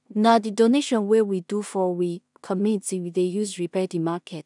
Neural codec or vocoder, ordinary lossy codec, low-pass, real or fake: codec, 16 kHz in and 24 kHz out, 0.4 kbps, LongCat-Audio-Codec, two codebook decoder; none; 10.8 kHz; fake